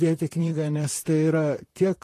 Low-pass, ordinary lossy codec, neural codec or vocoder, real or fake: 14.4 kHz; AAC, 48 kbps; vocoder, 44.1 kHz, 128 mel bands, Pupu-Vocoder; fake